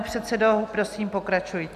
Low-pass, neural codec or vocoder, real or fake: 14.4 kHz; none; real